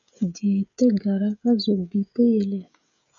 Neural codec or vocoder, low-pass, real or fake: codec, 16 kHz, 16 kbps, FreqCodec, smaller model; 7.2 kHz; fake